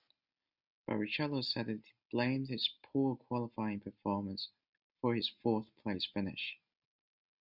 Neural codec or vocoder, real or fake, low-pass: none; real; 5.4 kHz